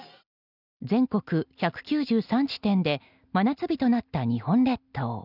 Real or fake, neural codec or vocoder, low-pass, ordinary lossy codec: real; none; 5.4 kHz; none